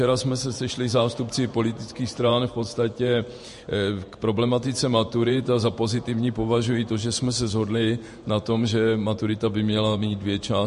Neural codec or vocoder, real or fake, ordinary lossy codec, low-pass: vocoder, 48 kHz, 128 mel bands, Vocos; fake; MP3, 48 kbps; 14.4 kHz